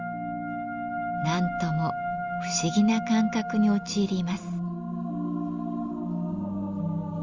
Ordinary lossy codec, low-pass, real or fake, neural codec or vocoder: Opus, 32 kbps; 7.2 kHz; real; none